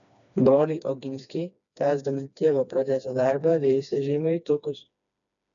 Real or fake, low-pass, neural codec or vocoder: fake; 7.2 kHz; codec, 16 kHz, 2 kbps, FreqCodec, smaller model